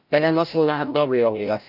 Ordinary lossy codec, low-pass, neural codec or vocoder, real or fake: none; 5.4 kHz; codec, 16 kHz, 0.5 kbps, FreqCodec, larger model; fake